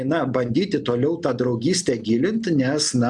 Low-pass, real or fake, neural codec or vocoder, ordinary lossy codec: 10.8 kHz; real; none; MP3, 96 kbps